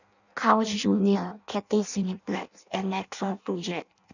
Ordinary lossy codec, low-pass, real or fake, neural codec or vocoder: none; 7.2 kHz; fake; codec, 16 kHz in and 24 kHz out, 0.6 kbps, FireRedTTS-2 codec